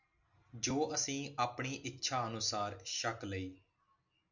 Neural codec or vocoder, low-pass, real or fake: none; 7.2 kHz; real